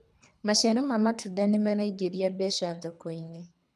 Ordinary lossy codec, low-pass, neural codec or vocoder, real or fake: none; none; codec, 24 kHz, 3 kbps, HILCodec; fake